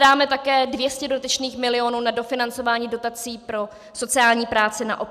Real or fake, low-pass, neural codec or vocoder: real; 14.4 kHz; none